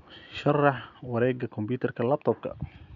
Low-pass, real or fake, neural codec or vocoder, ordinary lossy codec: 7.2 kHz; real; none; none